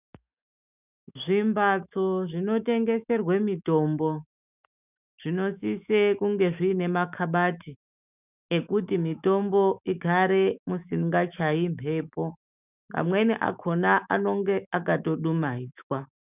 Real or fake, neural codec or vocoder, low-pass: fake; autoencoder, 48 kHz, 128 numbers a frame, DAC-VAE, trained on Japanese speech; 3.6 kHz